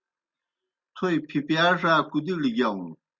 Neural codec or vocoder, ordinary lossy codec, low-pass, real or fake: none; Opus, 64 kbps; 7.2 kHz; real